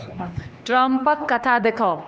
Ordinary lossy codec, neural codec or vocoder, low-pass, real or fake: none; codec, 16 kHz, 2 kbps, X-Codec, HuBERT features, trained on LibriSpeech; none; fake